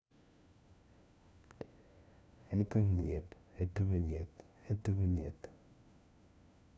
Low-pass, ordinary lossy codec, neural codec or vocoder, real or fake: none; none; codec, 16 kHz, 1 kbps, FunCodec, trained on LibriTTS, 50 frames a second; fake